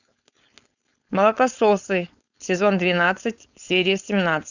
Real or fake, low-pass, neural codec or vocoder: fake; 7.2 kHz; codec, 16 kHz, 4.8 kbps, FACodec